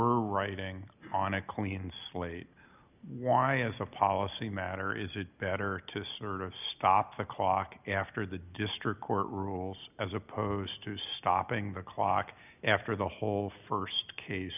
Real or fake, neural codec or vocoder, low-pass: real; none; 3.6 kHz